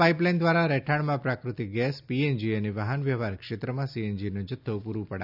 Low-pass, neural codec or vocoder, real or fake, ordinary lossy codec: 5.4 kHz; none; real; none